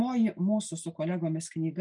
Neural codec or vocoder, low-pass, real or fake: none; 10.8 kHz; real